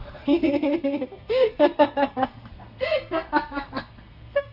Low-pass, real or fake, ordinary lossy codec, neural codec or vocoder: 5.4 kHz; fake; none; codec, 32 kHz, 1.9 kbps, SNAC